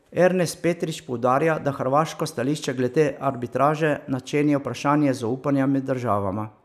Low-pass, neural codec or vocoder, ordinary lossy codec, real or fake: 14.4 kHz; none; none; real